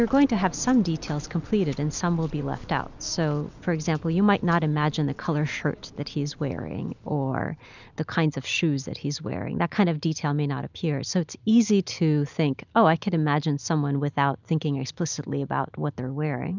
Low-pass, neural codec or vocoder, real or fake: 7.2 kHz; none; real